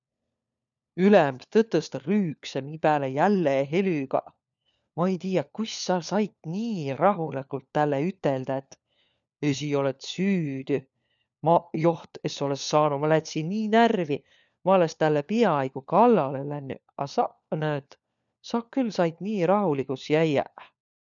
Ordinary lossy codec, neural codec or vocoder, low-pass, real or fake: none; codec, 16 kHz, 4 kbps, FunCodec, trained on LibriTTS, 50 frames a second; 7.2 kHz; fake